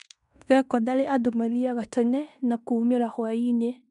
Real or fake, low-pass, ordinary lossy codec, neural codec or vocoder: fake; 10.8 kHz; none; codec, 16 kHz in and 24 kHz out, 0.9 kbps, LongCat-Audio-Codec, fine tuned four codebook decoder